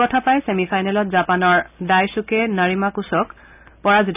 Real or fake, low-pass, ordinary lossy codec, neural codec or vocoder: real; 3.6 kHz; none; none